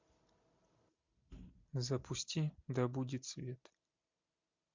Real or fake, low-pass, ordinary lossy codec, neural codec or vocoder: real; 7.2 kHz; MP3, 64 kbps; none